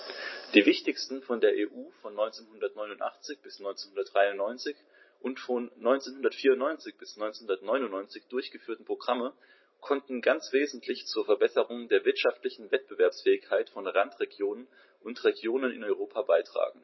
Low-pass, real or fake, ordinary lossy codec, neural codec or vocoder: 7.2 kHz; real; MP3, 24 kbps; none